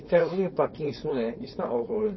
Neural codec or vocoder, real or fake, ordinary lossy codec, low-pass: codec, 16 kHz, 4.8 kbps, FACodec; fake; MP3, 24 kbps; 7.2 kHz